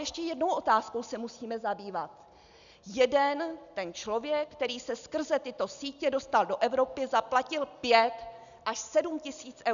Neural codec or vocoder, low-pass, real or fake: none; 7.2 kHz; real